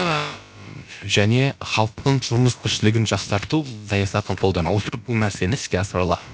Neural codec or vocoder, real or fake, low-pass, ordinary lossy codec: codec, 16 kHz, about 1 kbps, DyCAST, with the encoder's durations; fake; none; none